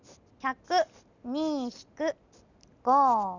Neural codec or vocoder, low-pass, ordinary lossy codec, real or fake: none; 7.2 kHz; none; real